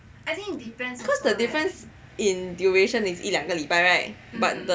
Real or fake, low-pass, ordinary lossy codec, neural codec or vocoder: real; none; none; none